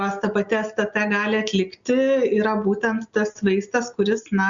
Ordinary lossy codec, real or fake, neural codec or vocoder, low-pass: Opus, 64 kbps; real; none; 7.2 kHz